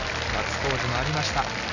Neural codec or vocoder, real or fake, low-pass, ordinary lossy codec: none; real; 7.2 kHz; none